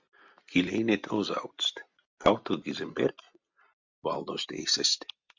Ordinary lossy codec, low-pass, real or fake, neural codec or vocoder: MP3, 64 kbps; 7.2 kHz; real; none